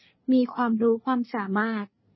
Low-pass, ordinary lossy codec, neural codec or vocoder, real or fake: 7.2 kHz; MP3, 24 kbps; codec, 24 kHz, 1 kbps, SNAC; fake